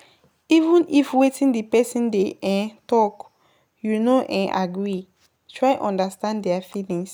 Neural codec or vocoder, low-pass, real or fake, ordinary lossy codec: none; none; real; none